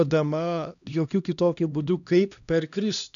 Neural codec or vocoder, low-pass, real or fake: codec, 16 kHz, 1 kbps, X-Codec, HuBERT features, trained on LibriSpeech; 7.2 kHz; fake